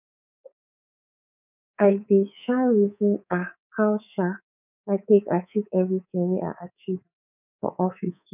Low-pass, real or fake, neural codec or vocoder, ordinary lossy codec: 3.6 kHz; fake; codec, 24 kHz, 3.1 kbps, DualCodec; none